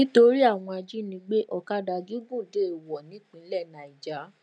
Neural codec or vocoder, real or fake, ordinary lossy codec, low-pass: none; real; none; 9.9 kHz